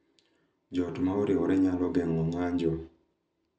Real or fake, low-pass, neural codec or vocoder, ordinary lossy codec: real; none; none; none